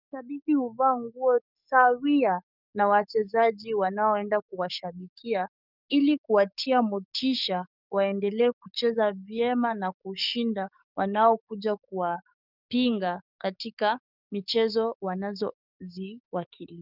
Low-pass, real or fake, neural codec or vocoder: 5.4 kHz; fake; codec, 44.1 kHz, 7.8 kbps, DAC